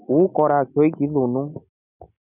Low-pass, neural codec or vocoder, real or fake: 3.6 kHz; none; real